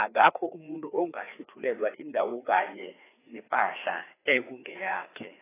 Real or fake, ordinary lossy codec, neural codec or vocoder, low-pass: fake; AAC, 16 kbps; codec, 16 kHz, 2 kbps, FreqCodec, larger model; 3.6 kHz